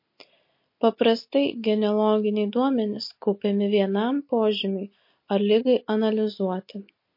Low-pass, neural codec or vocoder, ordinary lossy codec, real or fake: 5.4 kHz; none; MP3, 32 kbps; real